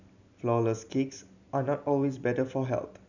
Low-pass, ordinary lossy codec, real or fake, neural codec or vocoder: 7.2 kHz; none; real; none